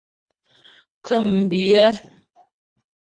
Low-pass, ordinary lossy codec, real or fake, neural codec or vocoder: 9.9 kHz; Opus, 64 kbps; fake; codec, 24 kHz, 1.5 kbps, HILCodec